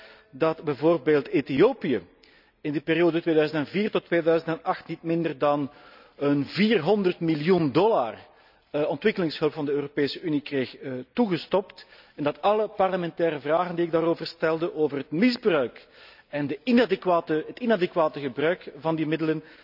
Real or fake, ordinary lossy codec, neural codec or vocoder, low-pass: real; none; none; 5.4 kHz